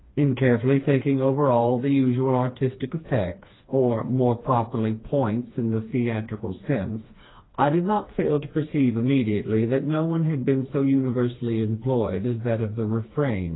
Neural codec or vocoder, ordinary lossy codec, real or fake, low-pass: codec, 16 kHz, 2 kbps, FreqCodec, smaller model; AAC, 16 kbps; fake; 7.2 kHz